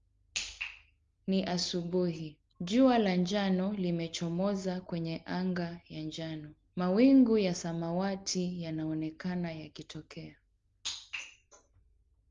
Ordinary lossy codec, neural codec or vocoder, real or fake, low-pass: Opus, 32 kbps; none; real; 7.2 kHz